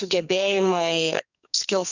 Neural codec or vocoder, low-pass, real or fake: codec, 44.1 kHz, 2.6 kbps, SNAC; 7.2 kHz; fake